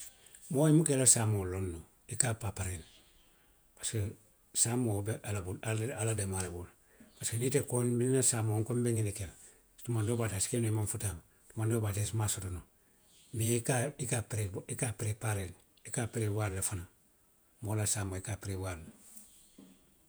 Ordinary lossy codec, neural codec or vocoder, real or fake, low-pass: none; vocoder, 48 kHz, 128 mel bands, Vocos; fake; none